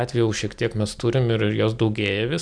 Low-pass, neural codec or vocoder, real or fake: 9.9 kHz; none; real